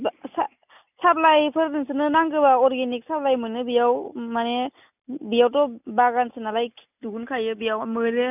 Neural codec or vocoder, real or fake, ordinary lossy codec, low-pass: none; real; none; 3.6 kHz